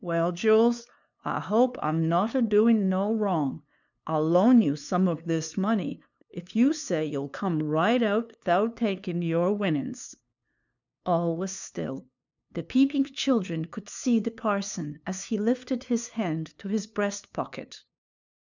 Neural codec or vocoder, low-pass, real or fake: codec, 16 kHz, 2 kbps, FunCodec, trained on LibriTTS, 25 frames a second; 7.2 kHz; fake